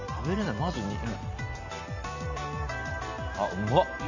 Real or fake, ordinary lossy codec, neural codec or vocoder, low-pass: fake; none; vocoder, 44.1 kHz, 128 mel bands every 256 samples, BigVGAN v2; 7.2 kHz